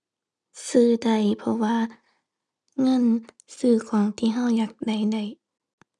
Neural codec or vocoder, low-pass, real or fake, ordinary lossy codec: none; none; real; none